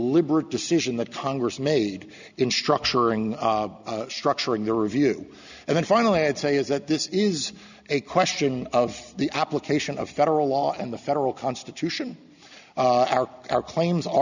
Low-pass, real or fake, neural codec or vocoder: 7.2 kHz; real; none